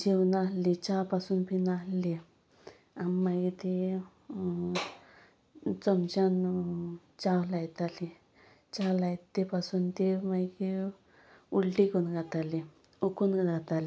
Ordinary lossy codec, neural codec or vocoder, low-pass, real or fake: none; none; none; real